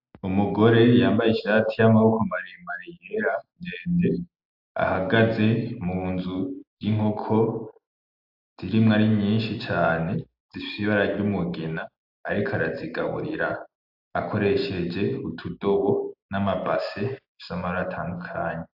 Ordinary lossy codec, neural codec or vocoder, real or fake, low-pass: AAC, 48 kbps; none; real; 5.4 kHz